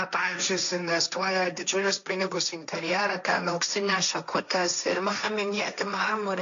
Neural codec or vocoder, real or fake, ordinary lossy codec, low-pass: codec, 16 kHz, 1.1 kbps, Voila-Tokenizer; fake; MP3, 64 kbps; 7.2 kHz